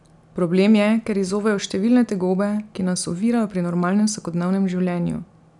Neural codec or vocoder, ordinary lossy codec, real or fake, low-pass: none; none; real; 10.8 kHz